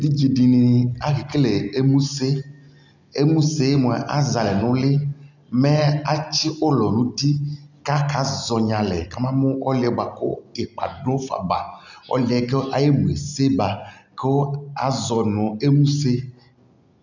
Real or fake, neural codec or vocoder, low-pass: real; none; 7.2 kHz